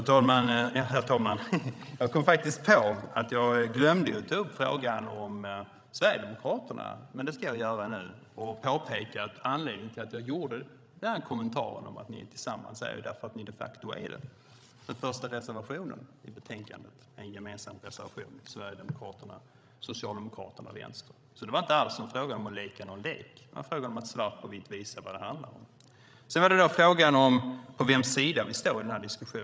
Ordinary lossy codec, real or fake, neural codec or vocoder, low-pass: none; fake; codec, 16 kHz, 16 kbps, FreqCodec, larger model; none